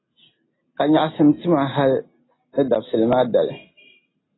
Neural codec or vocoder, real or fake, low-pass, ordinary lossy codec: none; real; 7.2 kHz; AAC, 16 kbps